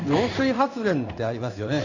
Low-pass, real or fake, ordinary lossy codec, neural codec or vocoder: 7.2 kHz; fake; none; codec, 16 kHz in and 24 kHz out, 1 kbps, XY-Tokenizer